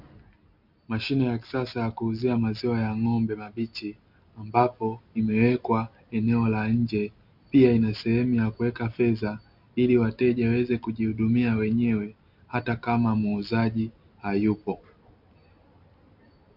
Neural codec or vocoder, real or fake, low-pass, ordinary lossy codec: none; real; 5.4 kHz; MP3, 48 kbps